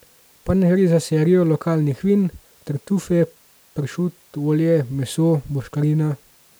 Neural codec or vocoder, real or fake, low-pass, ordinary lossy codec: none; real; none; none